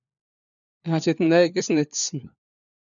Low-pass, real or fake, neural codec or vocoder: 7.2 kHz; fake; codec, 16 kHz, 4 kbps, FunCodec, trained on LibriTTS, 50 frames a second